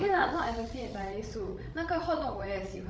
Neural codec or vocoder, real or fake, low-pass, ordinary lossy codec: codec, 16 kHz, 16 kbps, FreqCodec, larger model; fake; none; none